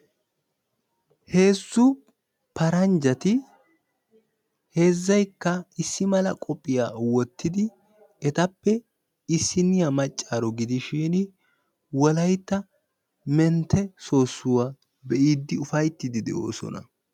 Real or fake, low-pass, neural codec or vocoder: real; 19.8 kHz; none